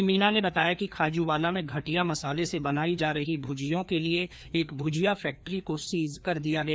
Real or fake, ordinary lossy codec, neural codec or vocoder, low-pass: fake; none; codec, 16 kHz, 2 kbps, FreqCodec, larger model; none